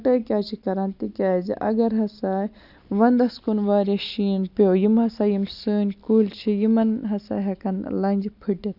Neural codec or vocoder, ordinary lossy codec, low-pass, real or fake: none; none; 5.4 kHz; real